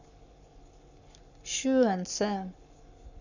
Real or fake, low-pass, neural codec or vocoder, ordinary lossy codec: fake; 7.2 kHz; codec, 44.1 kHz, 7.8 kbps, Pupu-Codec; none